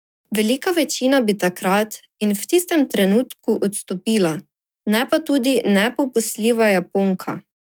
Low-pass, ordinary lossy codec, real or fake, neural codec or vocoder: none; none; fake; codec, 44.1 kHz, 7.8 kbps, DAC